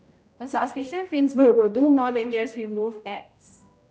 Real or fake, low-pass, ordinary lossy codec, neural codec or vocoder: fake; none; none; codec, 16 kHz, 0.5 kbps, X-Codec, HuBERT features, trained on general audio